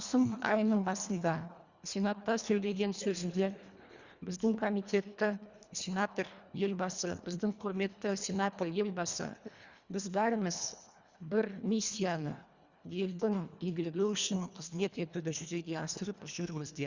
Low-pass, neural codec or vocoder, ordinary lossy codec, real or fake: 7.2 kHz; codec, 24 kHz, 1.5 kbps, HILCodec; Opus, 64 kbps; fake